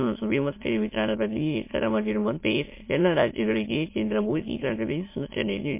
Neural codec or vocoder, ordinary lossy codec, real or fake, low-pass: autoencoder, 22.05 kHz, a latent of 192 numbers a frame, VITS, trained on many speakers; MP3, 24 kbps; fake; 3.6 kHz